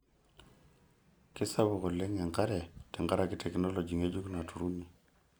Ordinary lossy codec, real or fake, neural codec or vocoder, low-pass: none; real; none; none